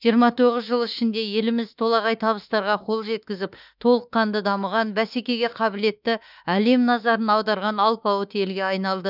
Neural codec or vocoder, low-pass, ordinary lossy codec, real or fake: autoencoder, 48 kHz, 32 numbers a frame, DAC-VAE, trained on Japanese speech; 5.4 kHz; none; fake